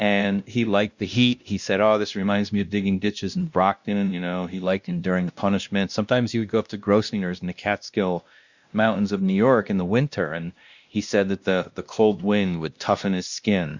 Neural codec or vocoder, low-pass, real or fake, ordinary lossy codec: codec, 16 kHz, 1 kbps, X-Codec, WavLM features, trained on Multilingual LibriSpeech; 7.2 kHz; fake; Opus, 64 kbps